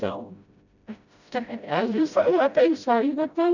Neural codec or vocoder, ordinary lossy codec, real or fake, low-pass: codec, 16 kHz, 0.5 kbps, FreqCodec, smaller model; none; fake; 7.2 kHz